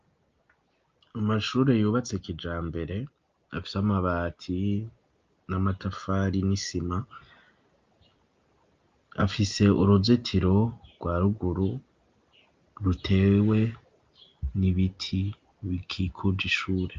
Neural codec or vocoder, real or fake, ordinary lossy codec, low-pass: none; real; Opus, 24 kbps; 7.2 kHz